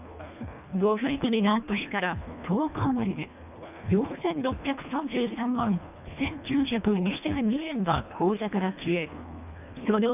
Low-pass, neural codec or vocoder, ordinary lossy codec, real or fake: 3.6 kHz; codec, 24 kHz, 1.5 kbps, HILCodec; none; fake